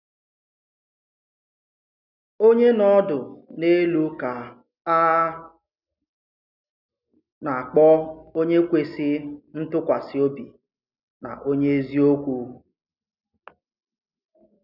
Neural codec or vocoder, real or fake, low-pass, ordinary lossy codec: none; real; 5.4 kHz; none